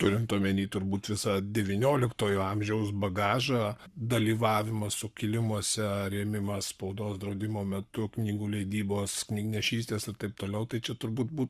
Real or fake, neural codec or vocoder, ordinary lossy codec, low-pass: fake; codec, 44.1 kHz, 7.8 kbps, Pupu-Codec; Opus, 64 kbps; 14.4 kHz